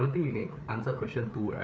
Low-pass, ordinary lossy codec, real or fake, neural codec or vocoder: none; none; fake; codec, 16 kHz, 4 kbps, FreqCodec, larger model